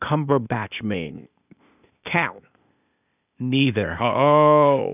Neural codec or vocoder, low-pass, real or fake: codec, 16 kHz, 0.8 kbps, ZipCodec; 3.6 kHz; fake